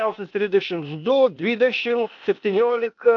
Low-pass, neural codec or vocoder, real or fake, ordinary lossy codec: 7.2 kHz; codec, 16 kHz, 0.8 kbps, ZipCodec; fake; AAC, 64 kbps